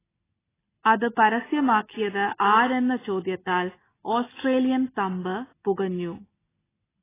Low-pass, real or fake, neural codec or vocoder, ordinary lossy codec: 3.6 kHz; real; none; AAC, 16 kbps